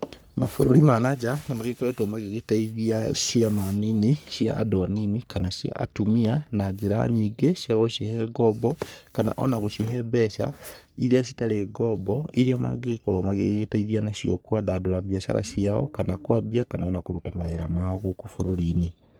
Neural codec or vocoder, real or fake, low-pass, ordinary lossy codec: codec, 44.1 kHz, 3.4 kbps, Pupu-Codec; fake; none; none